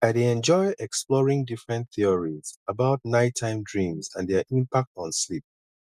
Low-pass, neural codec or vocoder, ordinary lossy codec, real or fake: 14.4 kHz; none; none; real